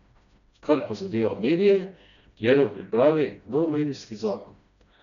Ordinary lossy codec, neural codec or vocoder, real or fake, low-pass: none; codec, 16 kHz, 1 kbps, FreqCodec, smaller model; fake; 7.2 kHz